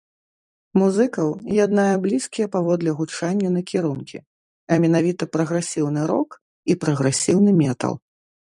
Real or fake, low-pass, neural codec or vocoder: fake; 10.8 kHz; vocoder, 44.1 kHz, 128 mel bands every 256 samples, BigVGAN v2